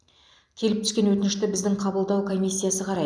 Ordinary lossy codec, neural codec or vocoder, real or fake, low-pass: none; none; real; none